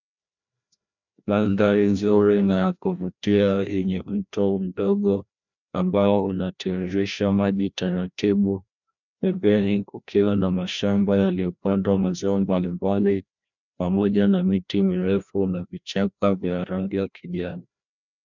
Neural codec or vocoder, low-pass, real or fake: codec, 16 kHz, 1 kbps, FreqCodec, larger model; 7.2 kHz; fake